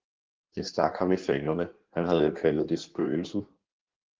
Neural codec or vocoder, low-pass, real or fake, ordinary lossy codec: codec, 16 kHz in and 24 kHz out, 1.1 kbps, FireRedTTS-2 codec; 7.2 kHz; fake; Opus, 16 kbps